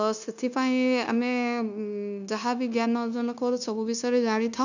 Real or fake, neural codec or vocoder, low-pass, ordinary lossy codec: fake; codec, 16 kHz, 0.9 kbps, LongCat-Audio-Codec; 7.2 kHz; none